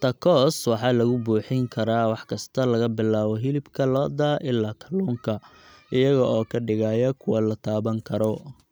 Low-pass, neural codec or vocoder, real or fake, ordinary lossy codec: none; none; real; none